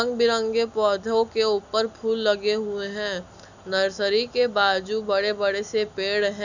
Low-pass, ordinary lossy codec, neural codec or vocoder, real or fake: 7.2 kHz; none; none; real